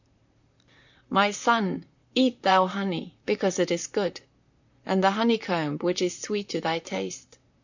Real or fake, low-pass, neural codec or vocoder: fake; 7.2 kHz; vocoder, 44.1 kHz, 128 mel bands, Pupu-Vocoder